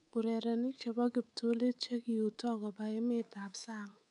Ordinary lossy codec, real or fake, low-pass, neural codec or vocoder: none; fake; 10.8 kHz; codec, 24 kHz, 3.1 kbps, DualCodec